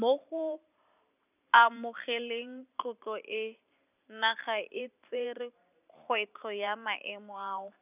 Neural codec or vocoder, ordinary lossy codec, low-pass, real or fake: vocoder, 44.1 kHz, 128 mel bands every 512 samples, BigVGAN v2; none; 3.6 kHz; fake